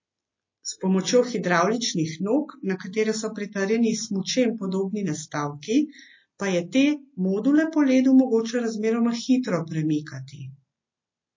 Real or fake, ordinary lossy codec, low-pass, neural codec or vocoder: real; MP3, 32 kbps; 7.2 kHz; none